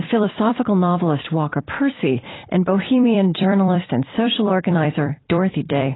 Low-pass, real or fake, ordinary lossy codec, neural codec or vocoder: 7.2 kHz; fake; AAC, 16 kbps; vocoder, 44.1 kHz, 128 mel bands every 256 samples, BigVGAN v2